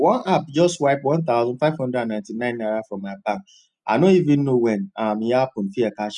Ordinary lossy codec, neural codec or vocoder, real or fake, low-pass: none; none; real; 10.8 kHz